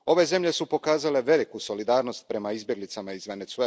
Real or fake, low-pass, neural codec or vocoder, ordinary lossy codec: real; none; none; none